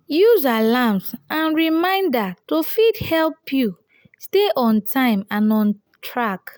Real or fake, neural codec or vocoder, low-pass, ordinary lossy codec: real; none; none; none